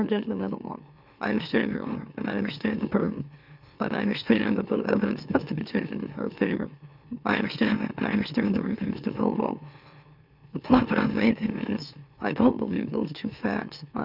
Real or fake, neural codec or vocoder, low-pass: fake; autoencoder, 44.1 kHz, a latent of 192 numbers a frame, MeloTTS; 5.4 kHz